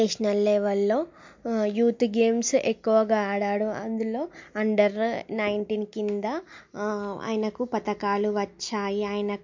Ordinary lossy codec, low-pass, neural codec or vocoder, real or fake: MP3, 48 kbps; 7.2 kHz; none; real